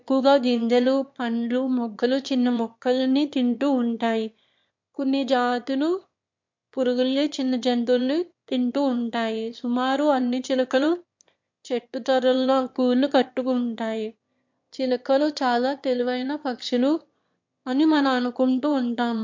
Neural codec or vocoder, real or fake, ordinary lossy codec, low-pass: autoencoder, 22.05 kHz, a latent of 192 numbers a frame, VITS, trained on one speaker; fake; MP3, 48 kbps; 7.2 kHz